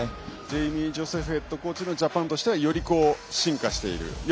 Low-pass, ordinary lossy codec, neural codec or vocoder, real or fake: none; none; none; real